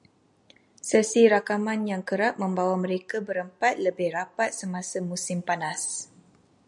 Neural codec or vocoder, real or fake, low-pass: none; real; 10.8 kHz